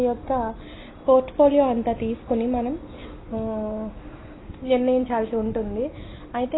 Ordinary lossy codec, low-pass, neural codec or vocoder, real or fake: AAC, 16 kbps; 7.2 kHz; none; real